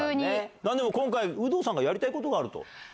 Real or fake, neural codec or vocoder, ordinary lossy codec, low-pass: real; none; none; none